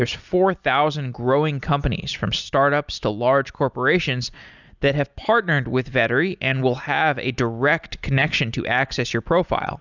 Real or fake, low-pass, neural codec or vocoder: real; 7.2 kHz; none